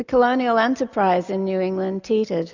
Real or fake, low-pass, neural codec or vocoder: real; 7.2 kHz; none